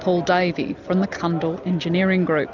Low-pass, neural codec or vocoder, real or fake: 7.2 kHz; none; real